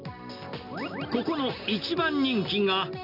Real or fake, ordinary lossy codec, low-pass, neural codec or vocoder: real; none; 5.4 kHz; none